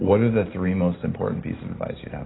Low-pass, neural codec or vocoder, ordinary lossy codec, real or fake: 7.2 kHz; none; AAC, 16 kbps; real